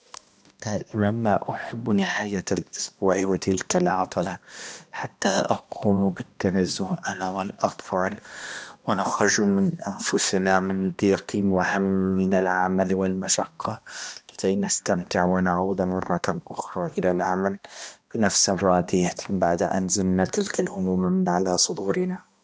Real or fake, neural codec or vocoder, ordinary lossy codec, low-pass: fake; codec, 16 kHz, 1 kbps, X-Codec, HuBERT features, trained on balanced general audio; none; none